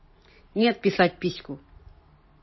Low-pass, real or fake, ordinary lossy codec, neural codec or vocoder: 7.2 kHz; real; MP3, 24 kbps; none